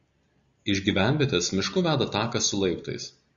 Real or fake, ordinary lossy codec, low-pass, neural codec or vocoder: real; Opus, 64 kbps; 7.2 kHz; none